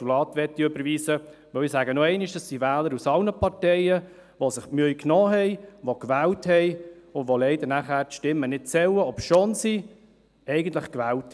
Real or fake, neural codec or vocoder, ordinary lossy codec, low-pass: real; none; none; none